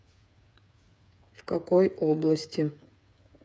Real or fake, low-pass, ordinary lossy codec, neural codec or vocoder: fake; none; none; codec, 16 kHz, 8 kbps, FreqCodec, smaller model